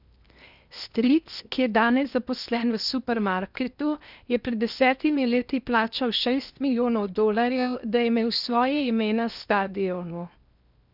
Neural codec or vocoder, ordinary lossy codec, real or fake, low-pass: codec, 16 kHz in and 24 kHz out, 0.8 kbps, FocalCodec, streaming, 65536 codes; none; fake; 5.4 kHz